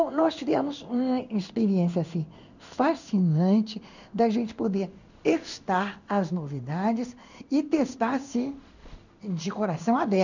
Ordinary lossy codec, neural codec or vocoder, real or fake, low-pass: none; codec, 16 kHz in and 24 kHz out, 1 kbps, XY-Tokenizer; fake; 7.2 kHz